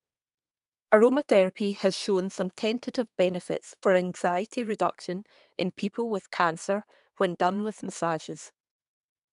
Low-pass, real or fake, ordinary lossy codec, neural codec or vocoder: 10.8 kHz; fake; none; codec, 24 kHz, 1 kbps, SNAC